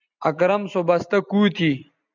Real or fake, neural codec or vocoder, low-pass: real; none; 7.2 kHz